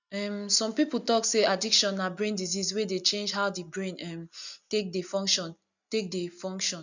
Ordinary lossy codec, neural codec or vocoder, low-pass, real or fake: none; none; 7.2 kHz; real